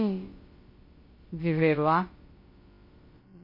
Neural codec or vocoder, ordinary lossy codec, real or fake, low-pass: codec, 16 kHz, about 1 kbps, DyCAST, with the encoder's durations; MP3, 24 kbps; fake; 5.4 kHz